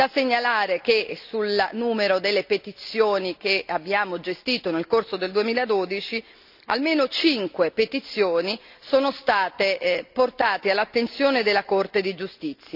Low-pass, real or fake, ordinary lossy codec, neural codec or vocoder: 5.4 kHz; real; none; none